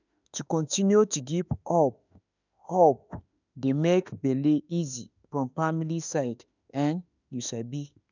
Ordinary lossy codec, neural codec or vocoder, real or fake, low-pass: none; autoencoder, 48 kHz, 32 numbers a frame, DAC-VAE, trained on Japanese speech; fake; 7.2 kHz